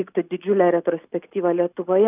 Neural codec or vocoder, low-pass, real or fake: none; 3.6 kHz; real